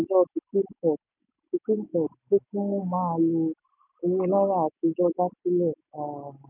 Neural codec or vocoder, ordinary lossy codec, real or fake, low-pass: none; none; real; 3.6 kHz